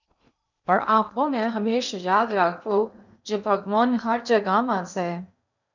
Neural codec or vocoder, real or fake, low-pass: codec, 16 kHz in and 24 kHz out, 0.8 kbps, FocalCodec, streaming, 65536 codes; fake; 7.2 kHz